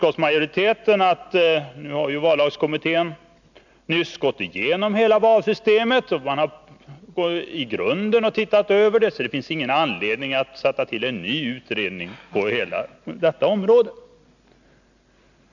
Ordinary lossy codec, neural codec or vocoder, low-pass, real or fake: none; none; 7.2 kHz; real